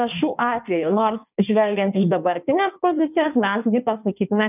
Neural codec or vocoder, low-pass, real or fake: codec, 16 kHz in and 24 kHz out, 1.1 kbps, FireRedTTS-2 codec; 3.6 kHz; fake